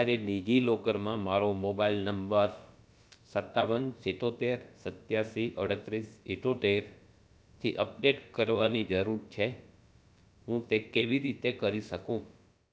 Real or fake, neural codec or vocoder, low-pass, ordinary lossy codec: fake; codec, 16 kHz, about 1 kbps, DyCAST, with the encoder's durations; none; none